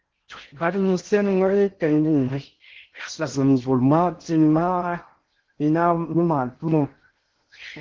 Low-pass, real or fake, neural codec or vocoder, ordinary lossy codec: 7.2 kHz; fake; codec, 16 kHz in and 24 kHz out, 0.6 kbps, FocalCodec, streaming, 2048 codes; Opus, 16 kbps